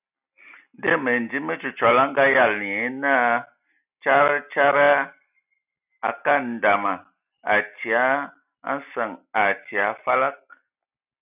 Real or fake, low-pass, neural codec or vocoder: real; 3.6 kHz; none